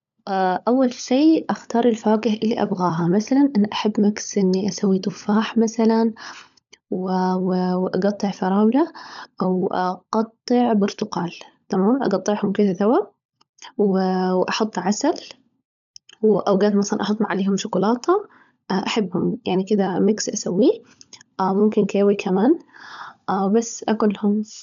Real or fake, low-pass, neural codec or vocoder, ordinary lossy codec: fake; 7.2 kHz; codec, 16 kHz, 16 kbps, FunCodec, trained on LibriTTS, 50 frames a second; none